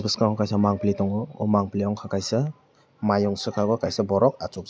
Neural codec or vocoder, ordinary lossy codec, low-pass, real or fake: none; none; none; real